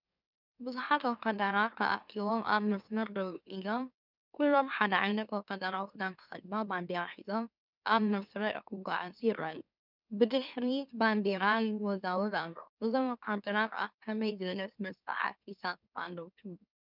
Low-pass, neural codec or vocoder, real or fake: 5.4 kHz; autoencoder, 44.1 kHz, a latent of 192 numbers a frame, MeloTTS; fake